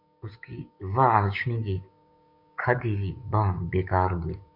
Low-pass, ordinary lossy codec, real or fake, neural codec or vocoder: 5.4 kHz; MP3, 48 kbps; fake; codec, 16 kHz, 6 kbps, DAC